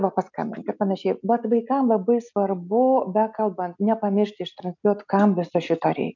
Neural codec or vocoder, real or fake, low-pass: none; real; 7.2 kHz